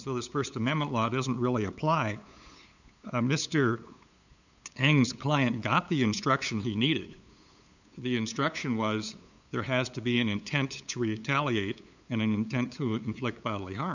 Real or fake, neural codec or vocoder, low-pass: fake; codec, 16 kHz, 8 kbps, FunCodec, trained on LibriTTS, 25 frames a second; 7.2 kHz